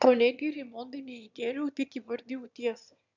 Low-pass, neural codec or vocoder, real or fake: 7.2 kHz; autoencoder, 22.05 kHz, a latent of 192 numbers a frame, VITS, trained on one speaker; fake